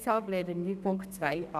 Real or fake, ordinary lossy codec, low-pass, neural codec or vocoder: fake; none; 14.4 kHz; codec, 32 kHz, 1.9 kbps, SNAC